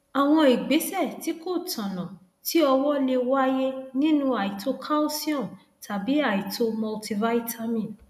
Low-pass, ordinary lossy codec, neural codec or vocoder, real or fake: 14.4 kHz; none; none; real